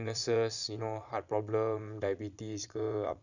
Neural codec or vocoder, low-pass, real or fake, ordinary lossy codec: vocoder, 22.05 kHz, 80 mel bands, Vocos; 7.2 kHz; fake; none